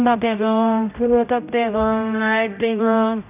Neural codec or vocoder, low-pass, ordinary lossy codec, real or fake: codec, 16 kHz, 0.5 kbps, X-Codec, HuBERT features, trained on general audio; 3.6 kHz; none; fake